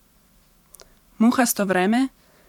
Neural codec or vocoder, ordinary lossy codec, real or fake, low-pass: vocoder, 44.1 kHz, 128 mel bands every 512 samples, BigVGAN v2; none; fake; 19.8 kHz